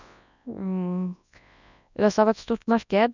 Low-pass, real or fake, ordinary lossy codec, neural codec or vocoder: 7.2 kHz; fake; none; codec, 24 kHz, 0.9 kbps, WavTokenizer, large speech release